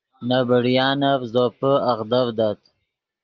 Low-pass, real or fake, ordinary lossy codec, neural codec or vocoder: 7.2 kHz; real; Opus, 32 kbps; none